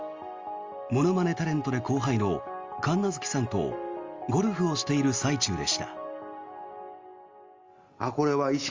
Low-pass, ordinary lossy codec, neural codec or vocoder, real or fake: 7.2 kHz; Opus, 32 kbps; none; real